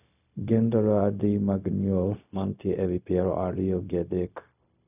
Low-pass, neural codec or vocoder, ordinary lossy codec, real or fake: 3.6 kHz; codec, 16 kHz, 0.4 kbps, LongCat-Audio-Codec; none; fake